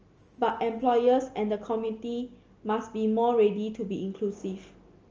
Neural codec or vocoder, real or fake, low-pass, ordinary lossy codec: none; real; 7.2 kHz; Opus, 24 kbps